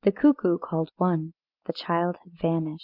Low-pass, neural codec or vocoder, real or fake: 5.4 kHz; none; real